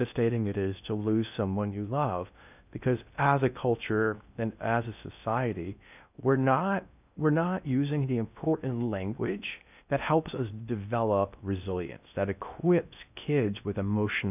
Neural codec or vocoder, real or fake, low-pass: codec, 16 kHz in and 24 kHz out, 0.6 kbps, FocalCodec, streaming, 2048 codes; fake; 3.6 kHz